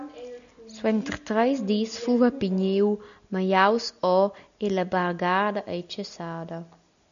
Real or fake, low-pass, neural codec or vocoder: real; 7.2 kHz; none